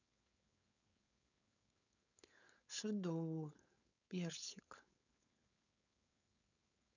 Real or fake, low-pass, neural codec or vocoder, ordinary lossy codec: fake; 7.2 kHz; codec, 16 kHz, 4.8 kbps, FACodec; none